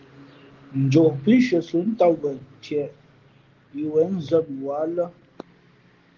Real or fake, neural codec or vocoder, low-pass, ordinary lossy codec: real; none; 7.2 kHz; Opus, 16 kbps